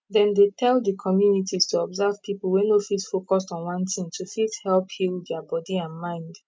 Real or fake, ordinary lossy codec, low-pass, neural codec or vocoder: real; none; none; none